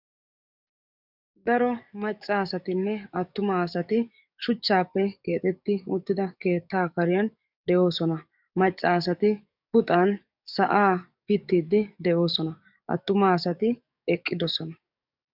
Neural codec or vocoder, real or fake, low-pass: codec, 44.1 kHz, 7.8 kbps, DAC; fake; 5.4 kHz